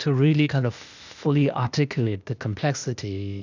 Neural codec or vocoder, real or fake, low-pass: codec, 16 kHz, 0.8 kbps, ZipCodec; fake; 7.2 kHz